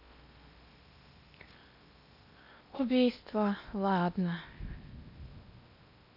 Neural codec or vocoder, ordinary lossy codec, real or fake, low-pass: codec, 16 kHz in and 24 kHz out, 0.8 kbps, FocalCodec, streaming, 65536 codes; none; fake; 5.4 kHz